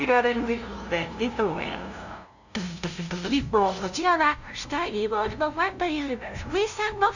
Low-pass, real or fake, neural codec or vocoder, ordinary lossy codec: 7.2 kHz; fake; codec, 16 kHz, 0.5 kbps, FunCodec, trained on LibriTTS, 25 frames a second; none